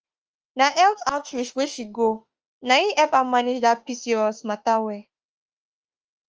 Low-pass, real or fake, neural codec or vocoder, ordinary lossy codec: 7.2 kHz; fake; autoencoder, 48 kHz, 32 numbers a frame, DAC-VAE, trained on Japanese speech; Opus, 24 kbps